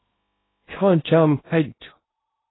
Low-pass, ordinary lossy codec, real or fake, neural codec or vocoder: 7.2 kHz; AAC, 16 kbps; fake; codec, 16 kHz in and 24 kHz out, 0.6 kbps, FocalCodec, streaming, 2048 codes